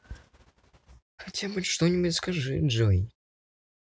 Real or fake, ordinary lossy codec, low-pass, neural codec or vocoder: real; none; none; none